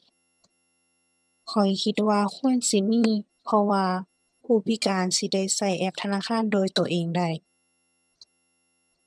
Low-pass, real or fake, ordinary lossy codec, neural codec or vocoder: none; fake; none; vocoder, 22.05 kHz, 80 mel bands, HiFi-GAN